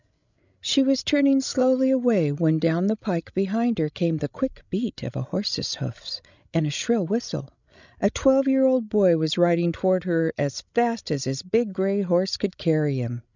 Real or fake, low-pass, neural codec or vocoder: fake; 7.2 kHz; codec, 16 kHz, 16 kbps, FreqCodec, larger model